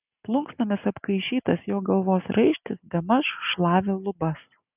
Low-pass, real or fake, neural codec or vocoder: 3.6 kHz; real; none